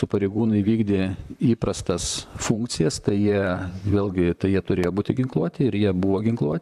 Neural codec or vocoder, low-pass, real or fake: vocoder, 44.1 kHz, 128 mel bands, Pupu-Vocoder; 14.4 kHz; fake